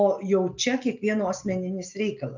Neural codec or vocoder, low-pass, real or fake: none; 7.2 kHz; real